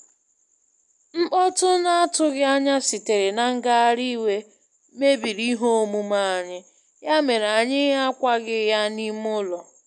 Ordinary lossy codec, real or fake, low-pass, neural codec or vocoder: none; real; 10.8 kHz; none